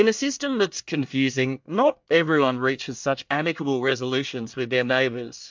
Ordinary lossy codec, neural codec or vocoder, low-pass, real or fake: MP3, 64 kbps; codec, 24 kHz, 1 kbps, SNAC; 7.2 kHz; fake